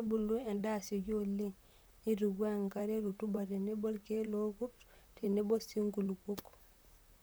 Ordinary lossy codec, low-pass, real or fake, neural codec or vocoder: none; none; fake; vocoder, 44.1 kHz, 128 mel bands, Pupu-Vocoder